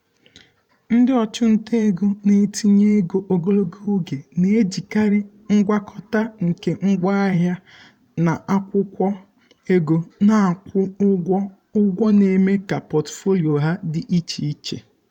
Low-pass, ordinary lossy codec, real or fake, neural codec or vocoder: 19.8 kHz; none; fake; vocoder, 44.1 kHz, 128 mel bands every 512 samples, BigVGAN v2